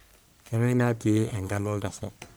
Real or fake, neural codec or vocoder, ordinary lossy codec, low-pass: fake; codec, 44.1 kHz, 1.7 kbps, Pupu-Codec; none; none